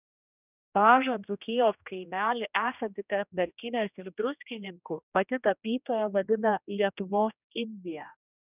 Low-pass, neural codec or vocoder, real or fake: 3.6 kHz; codec, 16 kHz, 1 kbps, X-Codec, HuBERT features, trained on general audio; fake